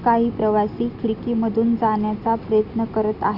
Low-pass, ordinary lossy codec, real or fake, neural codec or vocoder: 5.4 kHz; Opus, 64 kbps; real; none